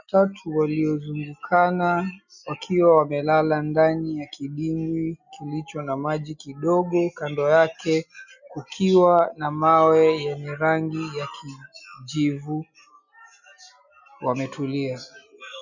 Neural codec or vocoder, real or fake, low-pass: none; real; 7.2 kHz